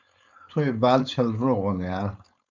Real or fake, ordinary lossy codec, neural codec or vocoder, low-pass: fake; MP3, 64 kbps; codec, 16 kHz, 4.8 kbps, FACodec; 7.2 kHz